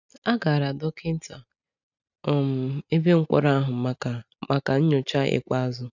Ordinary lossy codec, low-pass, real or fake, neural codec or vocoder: none; 7.2 kHz; real; none